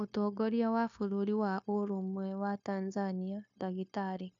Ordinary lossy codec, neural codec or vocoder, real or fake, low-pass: AAC, 64 kbps; codec, 16 kHz, 0.9 kbps, LongCat-Audio-Codec; fake; 7.2 kHz